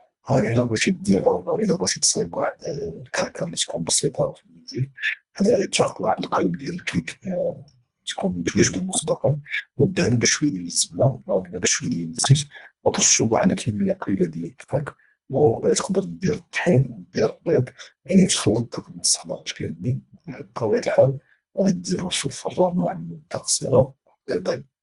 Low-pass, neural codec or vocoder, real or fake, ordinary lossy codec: 10.8 kHz; codec, 24 kHz, 1.5 kbps, HILCodec; fake; Opus, 64 kbps